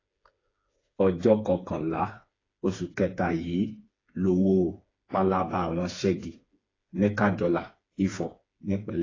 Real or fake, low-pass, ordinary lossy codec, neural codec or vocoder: fake; 7.2 kHz; AAC, 32 kbps; codec, 16 kHz, 4 kbps, FreqCodec, smaller model